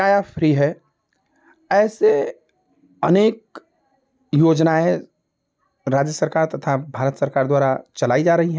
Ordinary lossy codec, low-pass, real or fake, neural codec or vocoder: none; none; real; none